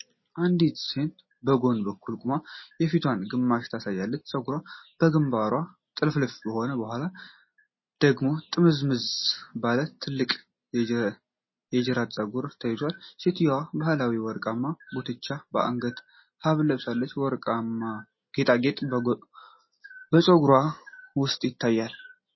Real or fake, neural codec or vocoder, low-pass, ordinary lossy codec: real; none; 7.2 kHz; MP3, 24 kbps